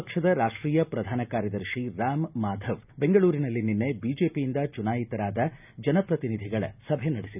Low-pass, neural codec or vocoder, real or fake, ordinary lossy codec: 3.6 kHz; none; real; none